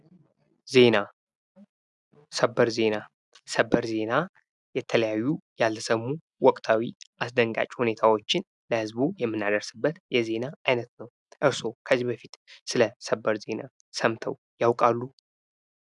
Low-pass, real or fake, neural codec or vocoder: 10.8 kHz; real; none